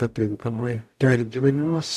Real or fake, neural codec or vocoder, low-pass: fake; codec, 44.1 kHz, 0.9 kbps, DAC; 14.4 kHz